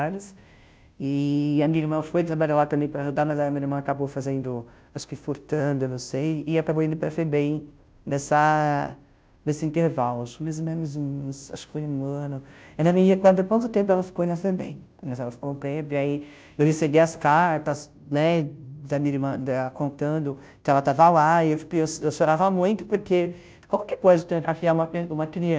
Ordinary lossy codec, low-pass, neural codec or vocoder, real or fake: none; none; codec, 16 kHz, 0.5 kbps, FunCodec, trained on Chinese and English, 25 frames a second; fake